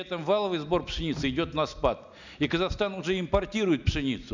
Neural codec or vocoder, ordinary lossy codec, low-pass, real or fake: none; none; 7.2 kHz; real